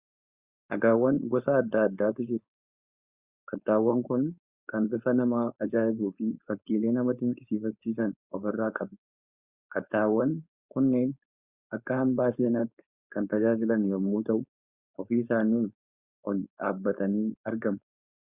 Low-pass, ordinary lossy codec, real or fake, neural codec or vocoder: 3.6 kHz; Opus, 64 kbps; fake; codec, 16 kHz, 4.8 kbps, FACodec